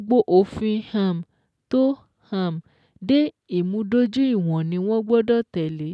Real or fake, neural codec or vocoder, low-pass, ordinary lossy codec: real; none; none; none